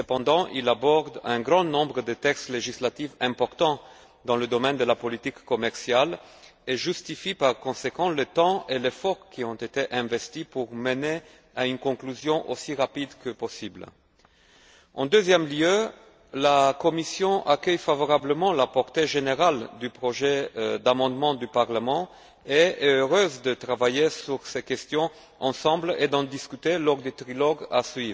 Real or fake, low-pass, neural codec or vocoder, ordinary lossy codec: real; none; none; none